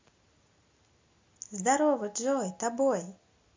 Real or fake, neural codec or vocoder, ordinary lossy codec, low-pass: real; none; MP3, 48 kbps; 7.2 kHz